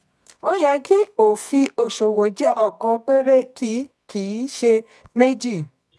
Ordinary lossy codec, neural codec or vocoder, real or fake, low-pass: none; codec, 24 kHz, 0.9 kbps, WavTokenizer, medium music audio release; fake; none